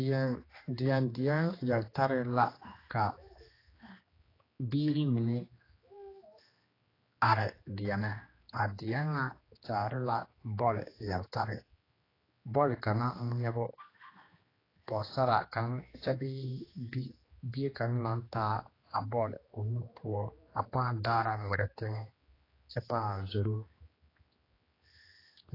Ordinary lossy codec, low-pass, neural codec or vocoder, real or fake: AAC, 24 kbps; 5.4 kHz; codec, 16 kHz, 2 kbps, X-Codec, HuBERT features, trained on general audio; fake